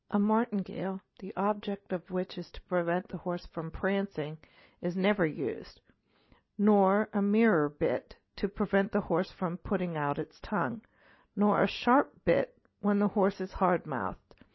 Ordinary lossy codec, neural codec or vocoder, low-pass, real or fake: MP3, 24 kbps; none; 7.2 kHz; real